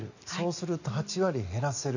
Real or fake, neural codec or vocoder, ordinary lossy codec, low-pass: real; none; none; 7.2 kHz